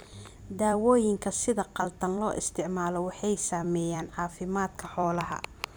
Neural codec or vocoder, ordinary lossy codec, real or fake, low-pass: vocoder, 44.1 kHz, 128 mel bands every 256 samples, BigVGAN v2; none; fake; none